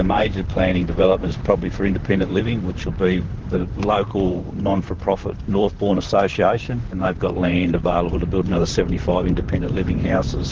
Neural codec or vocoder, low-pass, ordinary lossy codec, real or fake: vocoder, 44.1 kHz, 128 mel bands, Pupu-Vocoder; 7.2 kHz; Opus, 16 kbps; fake